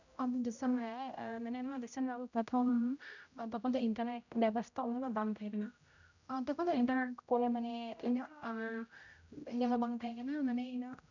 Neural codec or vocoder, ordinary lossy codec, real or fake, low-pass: codec, 16 kHz, 0.5 kbps, X-Codec, HuBERT features, trained on general audio; none; fake; 7.2 kHz